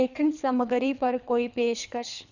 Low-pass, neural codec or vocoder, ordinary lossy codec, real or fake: 7.2 kHz; codec, 24 kHz, 3 kbps, HILCodec; none; fake